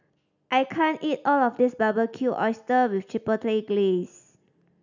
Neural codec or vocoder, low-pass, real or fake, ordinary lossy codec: none; 7.2 kHz; real; none